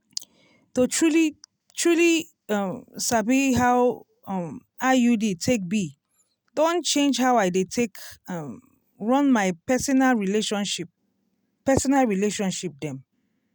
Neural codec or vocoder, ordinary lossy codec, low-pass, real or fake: none; none; none; real